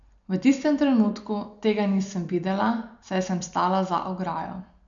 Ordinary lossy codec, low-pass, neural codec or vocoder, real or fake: none; 7.2 kHz; none; real